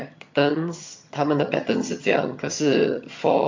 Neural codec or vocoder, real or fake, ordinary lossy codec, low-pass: vocoder, 22.05 kHz, 80 mel bands, HiFi-GAN; fake; MP3, 48 kbps; 7.2 kHz